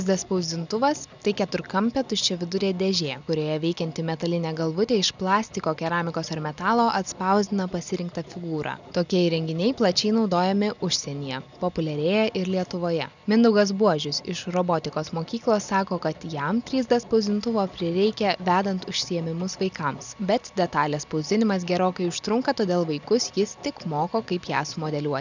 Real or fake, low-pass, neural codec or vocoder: real; 7.2 kHz; none